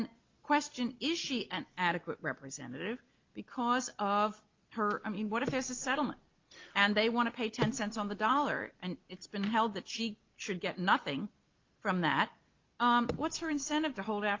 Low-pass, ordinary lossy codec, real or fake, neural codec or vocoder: 7.2 kHz; Opus, 32 kbps; real; none